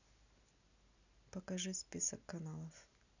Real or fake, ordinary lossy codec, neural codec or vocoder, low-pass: real; none; none; 7.2 kHz